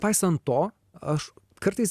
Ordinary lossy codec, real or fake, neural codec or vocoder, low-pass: Opus, 64 kbps; real; none; 14.4 kHz